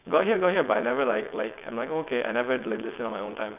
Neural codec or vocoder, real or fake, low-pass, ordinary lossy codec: vocoder, 22.05 kHz, 80 mel bands, WaveNeXt; fake; 3.6 kHz; none